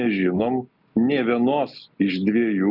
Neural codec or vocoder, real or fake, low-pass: none; real; 5.4 kHz